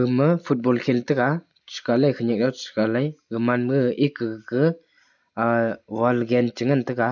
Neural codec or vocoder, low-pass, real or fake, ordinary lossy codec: none; 7.2 kHz; real; none